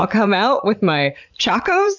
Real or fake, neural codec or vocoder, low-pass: fake; vocoder, 44.1 kHz, 80 mel bands, Vocos; 7.2 kHz